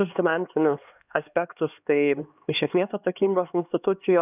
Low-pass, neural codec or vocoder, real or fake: 3.6 kHz; codec, 16 kHz, 2 kbps, X-Codec, HuBERT features, trained on LibriSpeech; fake